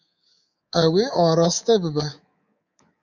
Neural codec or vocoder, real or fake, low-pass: codec, 24 kHz, 3.1 kbps, DualCodec; fake; 7.2 kHz